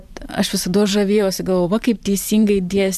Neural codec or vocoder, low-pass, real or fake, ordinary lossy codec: vocoder, 44.1 kHz, 128 mel bands every 512 samples, BigVGAN v2; 14.4 kHz; fake; MP3, 96 kbps